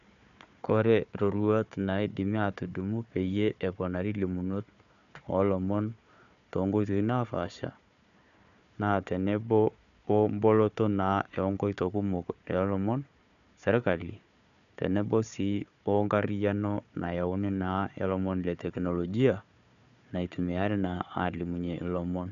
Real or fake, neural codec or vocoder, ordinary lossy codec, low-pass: fake; codec, 16 kHz, 4 kbps, FunCodec, trained on Chinese and English, 50 frames a second; none; 7.2 kHz